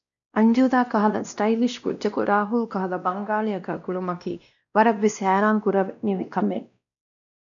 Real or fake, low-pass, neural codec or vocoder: fake; 7.2 kHz; codec, 16 kHz, 1 kbps, X-Codec, WavLM features, trained on Multilingual LibriSpeech